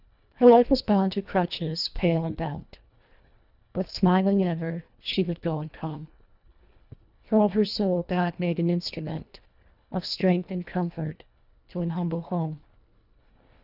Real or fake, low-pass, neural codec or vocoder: fake; 5.4 kHz; codec, 24 kHz, 1.5 kbps, HILCodec